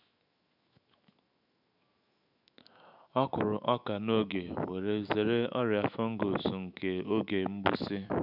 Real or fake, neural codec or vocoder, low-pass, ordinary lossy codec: fake; autoencoder, 48 kHz, 128 numbers a frame, DAC-VAE, trained on Japanese speech; 5.4 kHz; none